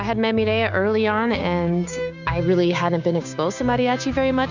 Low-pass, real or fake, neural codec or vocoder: 7.2 kHz; fake; codec, 16 kHz, 6 kbps, DAC